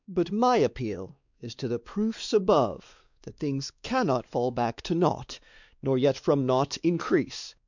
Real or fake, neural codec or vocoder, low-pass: fake; codec, 16 kHz, 2 kbps, X-Codec, WavLM features, trained on Multilingual LibriSpeech; 7.2 kHz